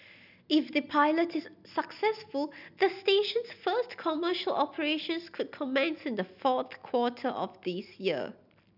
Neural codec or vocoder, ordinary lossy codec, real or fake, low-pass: none; none; real; 5.4 kHz